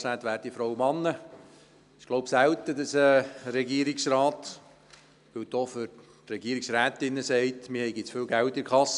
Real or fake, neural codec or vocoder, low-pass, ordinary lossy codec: real; none; 10.8 kHz; none